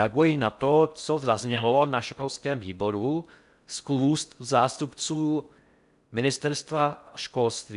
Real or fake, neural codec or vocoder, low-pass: fake; codec, 16 kHz in and 24 kHz out, 0.6 kbps, FocalCodec, streaming, 4096 codes; 10.8 kHz